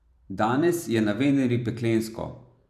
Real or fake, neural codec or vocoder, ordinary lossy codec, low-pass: fake; vocoder, 44.1 kHz, 128 mel bands every 512 samples, BigVGAN v2; none; 14.4 kHz